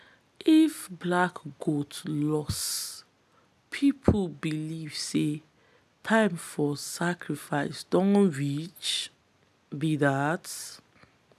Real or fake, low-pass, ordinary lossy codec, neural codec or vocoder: real; 14.4 kHz; none; none